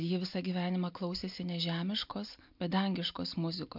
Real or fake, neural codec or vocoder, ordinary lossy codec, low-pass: real; none; MP3, 48 kbps; 5.4 kHz